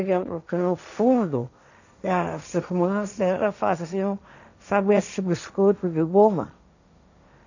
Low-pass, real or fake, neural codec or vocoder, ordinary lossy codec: 7.2 kHz; fake; codec, 16 kHz, 1.1 kbps, Voila-Tokenizer; none